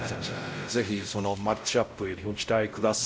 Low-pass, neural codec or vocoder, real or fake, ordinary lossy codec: none; codec, 16 kHz, 0.5 kbps, X-Codec, WavLM features, trained on Multilingual LibriSpeech; fake; none